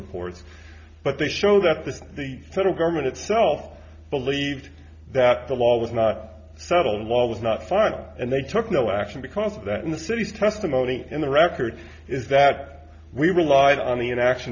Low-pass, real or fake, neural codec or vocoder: 7.2 kHz; real; none